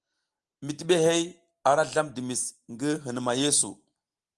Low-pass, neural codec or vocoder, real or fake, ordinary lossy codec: 10.8 kHz; none; real; Opus, 24 kbps